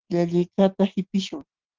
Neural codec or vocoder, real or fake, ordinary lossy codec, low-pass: none; real; Opus, 16 kbps; 7.2 kHz